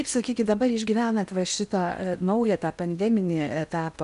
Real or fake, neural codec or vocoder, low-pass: fake; codec, 16 kHz in and 24 kHz out, 0.8 kbps, FocalCodec, streaming, 65536 codes; 10.8 kHz